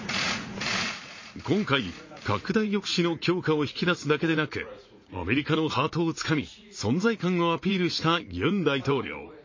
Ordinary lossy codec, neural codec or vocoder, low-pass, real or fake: MP3, 32 kbps; vocoder, 44.1 kHz, 80 mel bands, Vocos; 7.2 kHz; fake